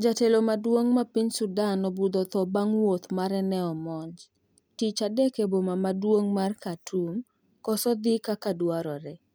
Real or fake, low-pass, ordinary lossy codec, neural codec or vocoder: real; none; none; none